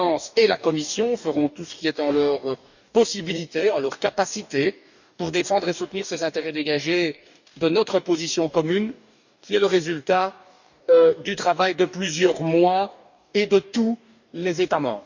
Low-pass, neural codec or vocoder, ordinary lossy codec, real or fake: 7.2 kHz; codec, 44.1 kHz, 2.6 kbps, DAC; none; fake